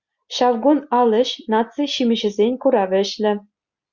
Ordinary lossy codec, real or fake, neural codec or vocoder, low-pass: Opus, 64 kbps; real; none; 7.2 kHz